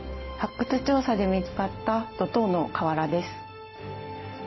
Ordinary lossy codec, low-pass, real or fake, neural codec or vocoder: MP3, 24 kbps; 7.2 kHz; real; none